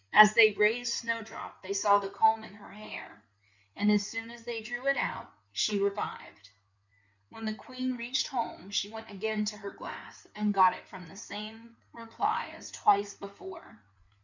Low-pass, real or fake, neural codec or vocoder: 7.2 kHz; fake; codec, 16 kHz in and 24 kHz out, 2.2 kbps, FireRedTTS-2 codec